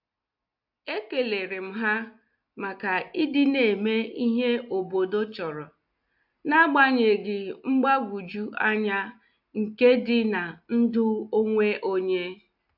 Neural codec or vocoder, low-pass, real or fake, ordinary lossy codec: none; 5.4 kHz; real; AAC, 48 kbps